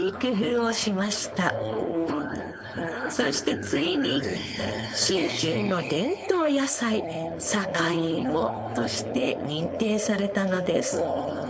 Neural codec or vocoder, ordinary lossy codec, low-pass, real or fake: codec, 16 kHz, 4.8 kbps, FACodec; none; none; fake